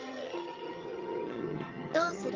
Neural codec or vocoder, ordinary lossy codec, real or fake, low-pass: vocoder, 22.05 kHz, 80 mel bands, HiFi-GAN; Opus, 24 kbps; fake; 7.2 kHz